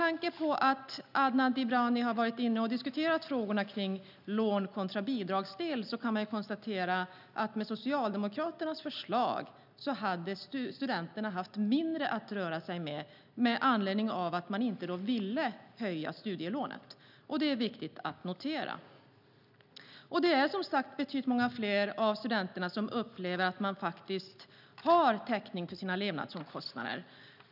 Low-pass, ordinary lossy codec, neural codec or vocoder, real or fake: 5.4 kHz; none; none; real